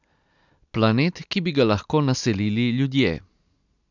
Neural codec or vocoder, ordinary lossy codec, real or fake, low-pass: none; none; real; 7.2 kHz